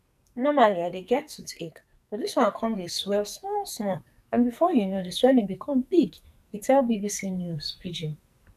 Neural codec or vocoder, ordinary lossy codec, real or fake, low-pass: codec, 44.1 kHz, 2.6 kbps, SNAC; none; fake; 14.4 kHz